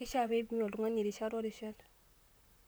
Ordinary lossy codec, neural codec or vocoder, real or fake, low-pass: none; vocoder, 44.1 kHz, 128 mel bands, Pupu-Vocoder; fake; none